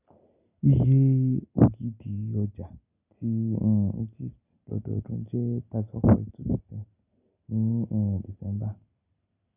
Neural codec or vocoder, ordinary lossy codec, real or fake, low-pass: none; none; real; 3.6 kHz